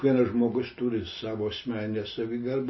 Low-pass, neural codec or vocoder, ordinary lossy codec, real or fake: 7.2 kHz; none; MP3, 24 kbps; real